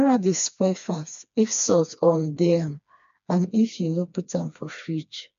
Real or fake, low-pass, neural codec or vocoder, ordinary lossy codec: fake; 7.2 kHz; codec, 16 kHz, 2 kbps, FreqCodec, smaller model; MP3, 64 kbps